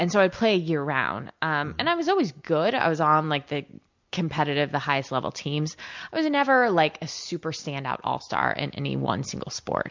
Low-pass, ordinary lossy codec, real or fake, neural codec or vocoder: 7.2 kHz; MP3, 64 kbps; real; none